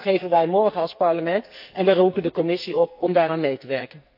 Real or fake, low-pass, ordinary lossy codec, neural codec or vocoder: fake; 5.4 kHz; AAC, 48 kbps; codec, 44.1 kHz, 2.6 kbps, SNAC